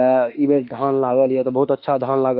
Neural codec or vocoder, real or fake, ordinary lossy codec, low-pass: autoencoder, 48 kHz, 32 numbers a frame, DAC-VAE, trained on Japanese speech; fake; Opus, 24 kbps; 5.4 kHz